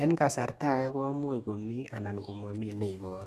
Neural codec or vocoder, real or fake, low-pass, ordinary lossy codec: codec, 44.1 kHz, 2.6 kbps, DAC; fake; 14.4 kHz; AAC, 96 kbps